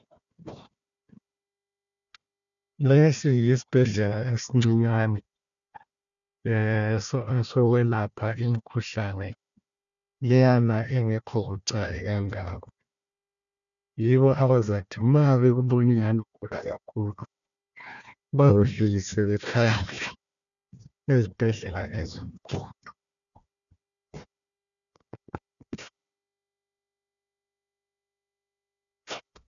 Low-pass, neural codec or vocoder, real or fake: 7.2 kHz; codec, 16 kHz, 1 kbps, FunCodec, trained on Chinese and English, 50 frames a second; fake